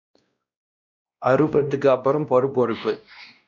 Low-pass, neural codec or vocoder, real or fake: 7.2 kHz; codec, 16 kHz, 1 kbps, X-Codec, WavLM features, trained on Multilingual LibriSpeech; fake